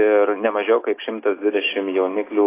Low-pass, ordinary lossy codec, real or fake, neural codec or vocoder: 3.6 kHz; AAC, 16 kbps; real; none